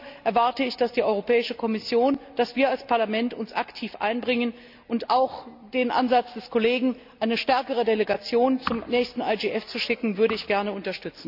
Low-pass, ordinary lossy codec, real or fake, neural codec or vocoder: 5.4 kHz; none; real; none